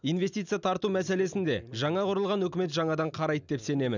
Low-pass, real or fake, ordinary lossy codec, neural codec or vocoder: 7.2 kHz; real; none; none